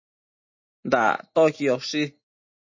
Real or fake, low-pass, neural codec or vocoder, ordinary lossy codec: real; 7.2 kHz; none; MP3, 32 kbps